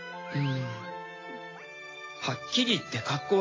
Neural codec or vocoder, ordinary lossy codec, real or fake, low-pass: none; AAC, 32 kbps; real; 7.2 kHz